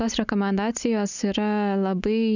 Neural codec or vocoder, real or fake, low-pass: none; real; 7.2 kHz